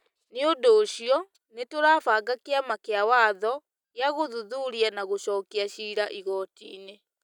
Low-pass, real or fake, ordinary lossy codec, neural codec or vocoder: 19.8 kHz; real; none; none